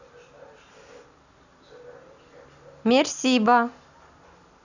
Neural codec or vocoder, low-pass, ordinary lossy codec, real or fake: none; 7.2 kHz; none; real